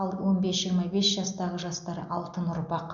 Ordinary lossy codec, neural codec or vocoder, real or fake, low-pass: none; none; real; 7.2 kHz